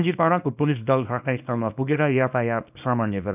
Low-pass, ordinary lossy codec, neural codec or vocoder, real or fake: 3.6 kHz; none; codec, 24 kHz, 0.9 kbps, WavTokenizer, small release; fake